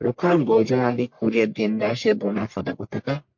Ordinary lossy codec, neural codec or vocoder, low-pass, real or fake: MP3, 48 kbps; codec, 44.1 kHz, 1.7 kbps, Pupu-Codec; 7.2 kHz; fake